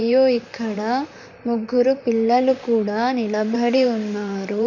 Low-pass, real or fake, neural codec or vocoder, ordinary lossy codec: 7.2 kHz; fake; vocoder, 44.1 kHz, 128 mel bands, Pupu-Vocoder; none